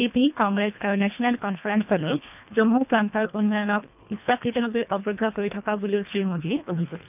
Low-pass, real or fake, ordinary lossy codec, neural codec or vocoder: 3.6 kHz; fake; none; codec, 24 kHz, 1.5 kbps, HILCodec